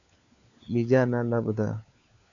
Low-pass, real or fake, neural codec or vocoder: 7.2 kHz; fake; codec, 16 kHz, 4 kbps, FunCodec, trained on LibriTTS, 50 frames a second